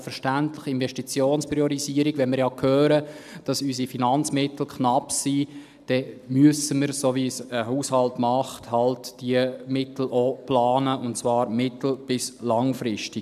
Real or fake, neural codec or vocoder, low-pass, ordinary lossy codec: real; none; 14.4 kHz; none